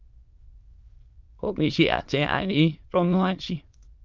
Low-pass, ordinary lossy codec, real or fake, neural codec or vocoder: 7.2 kHz; Opus, 24 kbps; fake; autoencoder, 22.05 kHz, a latent of 192 numbers a frame, VITS, trained on many speakers